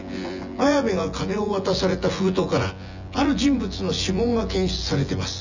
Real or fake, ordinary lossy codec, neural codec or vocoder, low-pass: fake; none; vocoder, 24 kHz, 100 mel bands, Vocos; 7.2 kHz